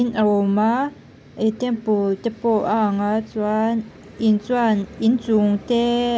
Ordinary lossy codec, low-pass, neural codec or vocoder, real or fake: none; none; none; real